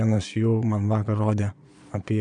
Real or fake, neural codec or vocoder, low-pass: fake; vocoder, 22.05 kHz, 80 mel bands, Vocos; 9.9 kHz